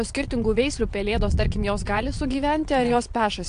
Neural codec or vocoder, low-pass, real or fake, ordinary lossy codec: vocoder, 22.05 kHz, 80 mel bands, WaveNeXt; 9.9 kHz; fake; AAC, 64 kbps